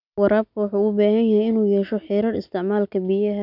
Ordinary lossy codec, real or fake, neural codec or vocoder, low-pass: none; real; none; 5.4 kHz